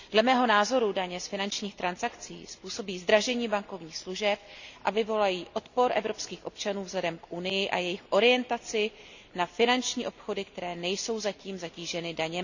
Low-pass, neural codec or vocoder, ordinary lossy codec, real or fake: 7.2 kHz; none; none; real